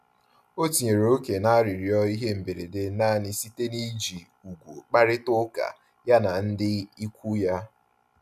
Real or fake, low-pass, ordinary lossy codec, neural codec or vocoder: real; 14.4 kHz; none; none